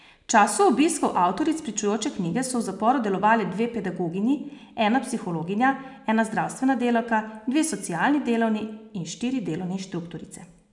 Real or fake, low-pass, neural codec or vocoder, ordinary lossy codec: real; 10.8 kHz; none; none